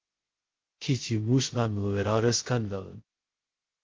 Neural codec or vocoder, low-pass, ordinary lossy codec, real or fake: codec, 16 kHz, 0.2 kbps, FocalCodec; 7.2 kHz; Opus, 16 kbps; fake